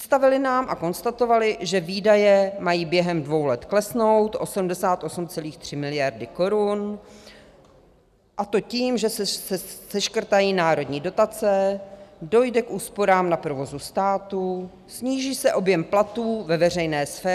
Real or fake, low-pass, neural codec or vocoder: real; 14.4 kHz; none